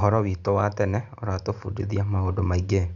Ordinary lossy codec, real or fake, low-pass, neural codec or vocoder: Opus, 64 kbps; real; 7.2 kHz; none